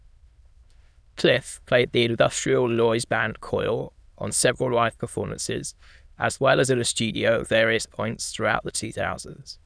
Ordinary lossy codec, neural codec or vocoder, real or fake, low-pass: none; autoencoder, 22.05 kHz, a latent of 192 numbers a frame, VITS, trained on many speakers; fake; none